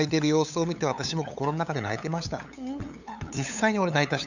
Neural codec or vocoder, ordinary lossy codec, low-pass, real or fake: codec, 16 kHz, 8 kbps, FunCodec, trained on LibriTTS, 25 frames a second; none; 7.2 kHz; fake